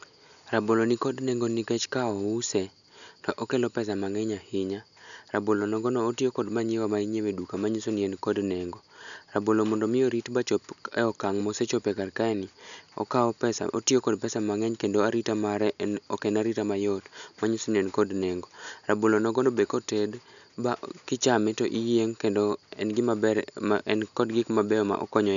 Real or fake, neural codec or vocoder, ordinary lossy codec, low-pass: real; none; none; 7.2 kHz